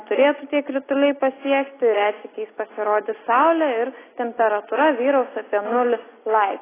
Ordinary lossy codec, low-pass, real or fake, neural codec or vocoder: AAC, 16 kbps; 3.6 kHz; real; none